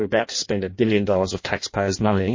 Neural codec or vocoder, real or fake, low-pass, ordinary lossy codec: codec, 16 kHz in and 24 kHz out, 0.6 kbps, FireRedTTS-2 codec; fake; 7.2 kHz; MP3, 32 kbps